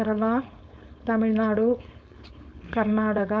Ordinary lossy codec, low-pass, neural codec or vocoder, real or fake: none; none; codec, 16 kHz, 4.8 kbps, FACodec; fake